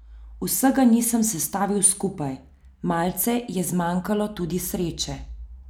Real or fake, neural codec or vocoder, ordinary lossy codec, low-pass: real; none; none; none